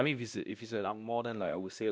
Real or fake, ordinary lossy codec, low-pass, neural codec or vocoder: fake; none; none; codec, 16 kHz, 1 kbps, X-Codec, WavLM features, trained on Multilingual LibriSpeech